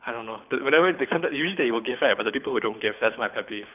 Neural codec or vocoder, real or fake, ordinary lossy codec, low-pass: codec, 24 kHz, 6 kbps, HILCodec; fake; none; 3.6 kHz